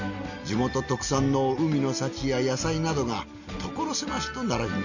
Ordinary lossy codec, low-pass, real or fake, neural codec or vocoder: none; 7.2 kHz; real; none